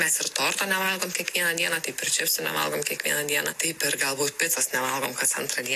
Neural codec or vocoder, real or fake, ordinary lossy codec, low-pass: none; real; AAC, 64 kbps; 14.4 kHz